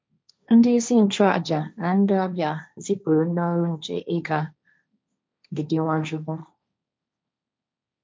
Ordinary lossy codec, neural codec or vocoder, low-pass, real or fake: none; codec, 16 kHz, 1.1 kbps, Voila-Tokenizer; none; fake